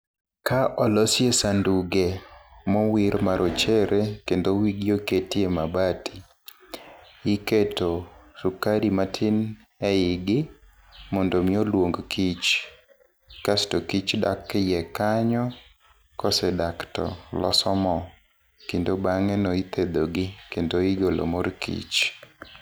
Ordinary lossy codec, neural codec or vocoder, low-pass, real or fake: none; none; none; real